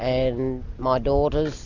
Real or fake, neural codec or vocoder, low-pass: real; none; 7.2 kHz